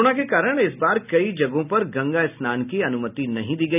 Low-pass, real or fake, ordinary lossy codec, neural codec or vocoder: 3.6 kHz; real; none; none